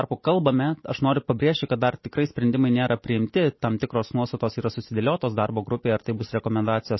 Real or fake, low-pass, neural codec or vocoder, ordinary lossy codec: real; 7.2 kHz; none; MP3, 24 kbps